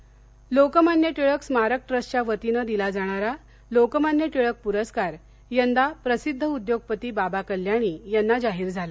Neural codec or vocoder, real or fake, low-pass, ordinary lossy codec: none; real; none; none